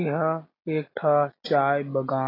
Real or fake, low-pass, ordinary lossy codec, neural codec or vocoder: real; 5.4 kHz; AAC, 24 kbps; none